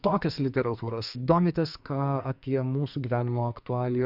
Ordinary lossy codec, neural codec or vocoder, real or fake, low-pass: Opus, 64 kbps; codec, 44.1 kHz, 2.6 kbps, SNAC; fake; 5.4 kHz